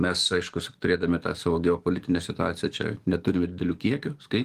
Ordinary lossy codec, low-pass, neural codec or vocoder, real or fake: Opus, 24 kbps; 14.4 kHz; codec, 44.1 kHz, 7.8 kbps, DAC; fake